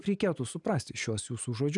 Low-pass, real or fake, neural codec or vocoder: 10.8 kHz; real; none